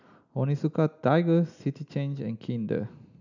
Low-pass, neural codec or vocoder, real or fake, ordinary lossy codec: 7.2 kHz; none; real; none